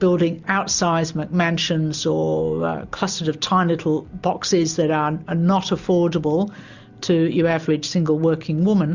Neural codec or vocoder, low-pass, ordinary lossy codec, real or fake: none; 7.2 kHz; Opus, 64 kbps; real